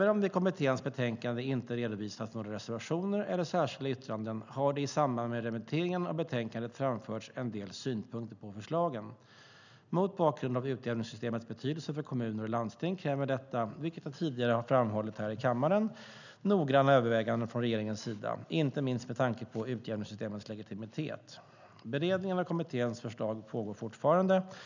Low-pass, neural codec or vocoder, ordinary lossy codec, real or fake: 7.2 kHz; none; none; real